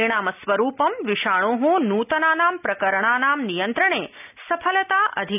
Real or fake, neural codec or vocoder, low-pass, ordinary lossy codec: real; none; 3.6 kHz; none